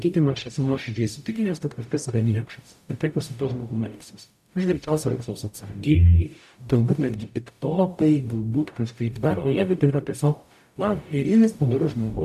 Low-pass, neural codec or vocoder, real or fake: 14.4 kHz; codec, 44.1 kHz, 0.9 kbps, DAC; fake